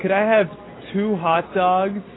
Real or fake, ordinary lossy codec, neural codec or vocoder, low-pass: real; AAC, 16 kbps; none; 7.2 kHz